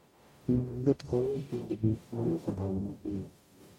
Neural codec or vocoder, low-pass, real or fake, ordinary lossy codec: codec, 44.1 kHz, 0.9 kbps, DAC; 19.8 kHz; fake; MP3, 64 kbps